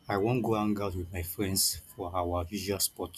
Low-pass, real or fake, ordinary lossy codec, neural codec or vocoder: 14.4 kHz; real; none; none